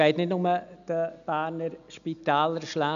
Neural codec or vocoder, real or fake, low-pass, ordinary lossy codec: none; real; 7.2 kHz; none